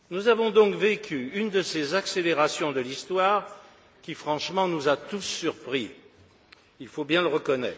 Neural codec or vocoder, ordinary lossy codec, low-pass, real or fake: none; none; none; real